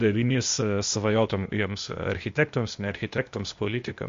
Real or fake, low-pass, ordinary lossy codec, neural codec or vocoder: fake; 7.2 kHz; MP3, 48 kbps; codec, 16 kHz, 0.8 kbps, ZipCodec